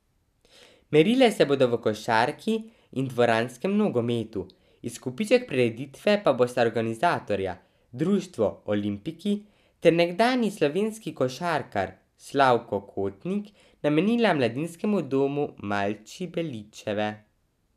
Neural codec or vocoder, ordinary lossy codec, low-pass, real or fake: none; none; 14.4 kHz; real